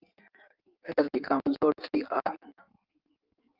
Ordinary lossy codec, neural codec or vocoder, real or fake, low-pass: Opus, 24 kbps; codec, 16 kHz in and 24 kHz out, 1.1 kbps, FireRedTTS-2 codec; fake; 5.4 kHz